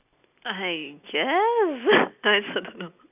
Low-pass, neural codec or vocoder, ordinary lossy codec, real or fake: 3.6 kHz; none; none; real